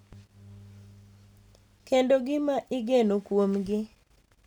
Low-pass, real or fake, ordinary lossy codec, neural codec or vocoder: 19.8 kHz; real; none; none